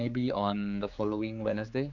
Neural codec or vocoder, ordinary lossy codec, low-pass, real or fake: codec, 16 kHz, 4 kbps, X-Codec, HuBERT features, trained on general audio; none; 7.2 kHz; fake